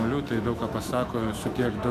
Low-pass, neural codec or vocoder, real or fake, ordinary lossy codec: 14.4 kHz; autoencoder, 48 kHz, 128 numbers a frame, DAC-VAE, trained on Japanese speech; fake; MP3, 96 kbps